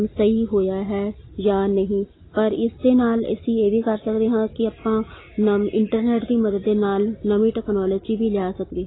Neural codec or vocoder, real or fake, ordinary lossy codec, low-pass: none; real; AAC, 16 kbps; 7.2 kHz